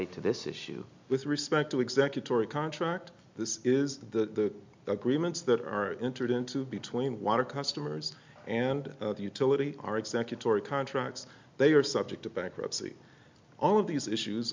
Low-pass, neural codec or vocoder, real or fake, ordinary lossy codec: 7.2 kHz; none; real; MP3, 64 kbps